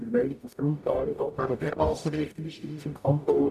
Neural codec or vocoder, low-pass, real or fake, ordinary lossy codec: codec, 44.1 kHz, 0.9 kbps, DAC; 14.4 kHz; fake; none